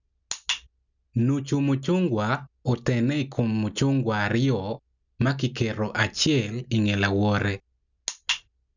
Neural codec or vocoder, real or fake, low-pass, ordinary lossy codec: none; real; 7.2 kHz; none